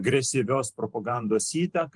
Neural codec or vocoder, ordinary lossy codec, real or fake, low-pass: vocoder, 44.1 kHz, 128 mel bands every 512 samples, BigVGAN v2; Opus, 24 kbps; fake; 10.8 kHz